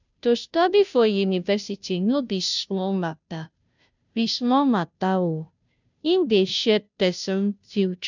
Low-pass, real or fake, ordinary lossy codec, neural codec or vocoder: 7.2 kHz; fake; none; codec, 16 kHz, 0.5 kbps, FunCodec, trained on Chinese and English, 25 frames a second